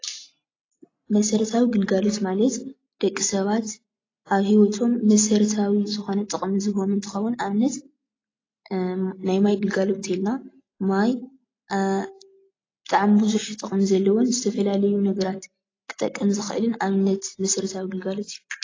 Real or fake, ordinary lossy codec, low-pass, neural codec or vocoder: real; AAC, 32 kbps; 7.2 kHz; none